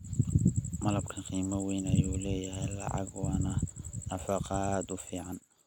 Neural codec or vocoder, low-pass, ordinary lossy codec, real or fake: none; 19.8 kHz; none; real